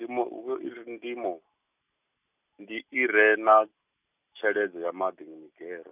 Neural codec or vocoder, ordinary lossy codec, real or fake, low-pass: none; none; real; 3.6 kHz